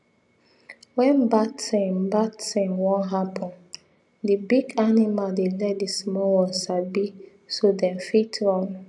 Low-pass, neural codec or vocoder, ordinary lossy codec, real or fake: 10.8 kHz; none; none; real